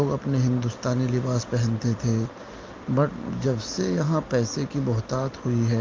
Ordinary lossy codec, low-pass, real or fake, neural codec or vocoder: Opus, 32 kbps; 7.2 kHz; real; none